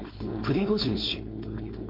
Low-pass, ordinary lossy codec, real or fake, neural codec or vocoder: 5.4 kHz; MP3, 32 kbps; fake; codec, 16 kHz, 4.8 kbps, FACodec